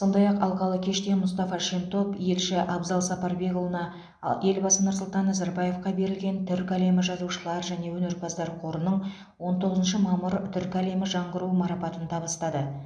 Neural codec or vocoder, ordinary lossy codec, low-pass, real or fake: none; MP3, 64 kbps; 9.9 kHz; real